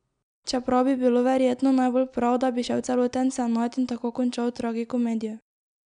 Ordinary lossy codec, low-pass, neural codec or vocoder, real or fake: MP3, 96 kbps; 9.9 kHz; none; real